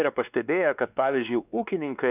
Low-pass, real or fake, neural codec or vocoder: 3.6 kHz; fake; codec, 16 kHz, 2 kbps, X-Codec, WavLM features, trained on Multilingual LibriSpeech